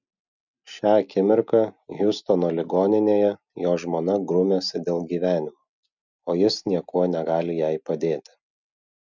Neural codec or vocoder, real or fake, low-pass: none; real; 7.2 kHz